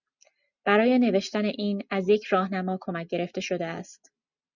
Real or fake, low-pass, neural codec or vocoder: real; 7.2 kHz; none